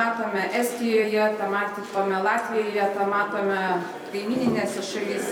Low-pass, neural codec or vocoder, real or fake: 19.8 kHz; vocoder, 44.1 kHz, 128 mel bands every 256 samples, BigVGAN v2; fake